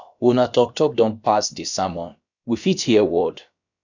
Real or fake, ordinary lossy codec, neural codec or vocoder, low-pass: fake; none; codec, 16 kHz, about 1 kbps, DyCAST, with the encoder's durations; 7.2 kHz